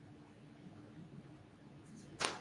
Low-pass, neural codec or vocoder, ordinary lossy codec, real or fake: 10.8 kHz; codec, 24 kHz, 0.9 kbps, WavTokenizer, medium speech release version 2; MP3, 96 kbps; fake